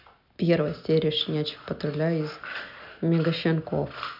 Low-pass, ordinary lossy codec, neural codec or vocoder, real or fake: 5.4 kHz; none; none; real